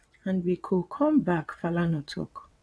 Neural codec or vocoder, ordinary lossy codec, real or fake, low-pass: vocoder, 22.05 kHz, 80 mel bands, WaveNeXt; none; fake; none